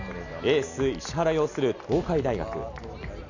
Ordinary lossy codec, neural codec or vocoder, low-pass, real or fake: none; none; 7.2 kHz; real